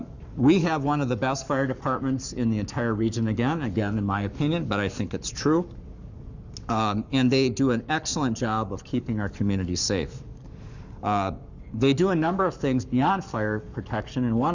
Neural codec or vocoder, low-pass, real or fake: codec, 44.1 kHz, 7.8 kbps, Pupu-Codec; 7.2 kHz; fake